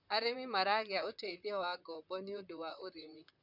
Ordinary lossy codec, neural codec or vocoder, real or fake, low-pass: none; vocoder, 44.1 kHz, 128 mel bands, Pupu-Vocoder; fake; 5.4 kHz